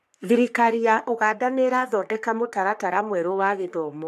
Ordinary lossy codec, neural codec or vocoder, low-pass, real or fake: none; codec, 44.1 kHz, 3.4 kbps, Pupu-Codec; 14.4 kHz; fake